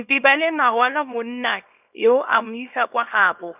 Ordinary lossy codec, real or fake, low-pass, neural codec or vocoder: none; fake; 3.6 kHz; codec, 24 kHz, 0.9 kbps, WavTokenizer, small release